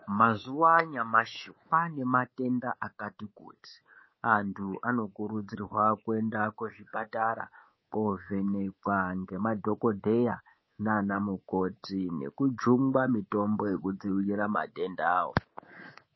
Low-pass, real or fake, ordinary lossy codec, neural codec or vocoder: 7.2 kHz; fake; MP3, 24 kbps; codec, 24 kHz, 3.1 kbps, DualCodec